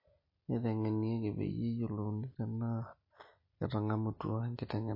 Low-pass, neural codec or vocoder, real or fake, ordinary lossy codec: 5.4 kHz; none; real; MP3, 24 kbps